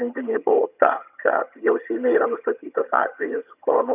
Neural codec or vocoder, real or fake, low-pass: vocoder, 22.05 kHz, 80 mel bands, HiFi-GAN; fake; 3.6 kHz